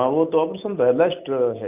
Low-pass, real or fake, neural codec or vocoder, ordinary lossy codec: 3.6 kHz; real; none; none